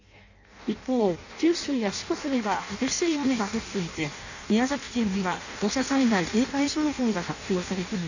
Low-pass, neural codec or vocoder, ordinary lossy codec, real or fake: 7.2 kHz; codec, 16 kHz in and 24 kHz out, 0.6 kbps, FireRedTTS-2 codec; none; fake